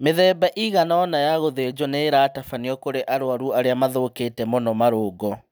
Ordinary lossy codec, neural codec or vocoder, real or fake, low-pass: none; none; real; none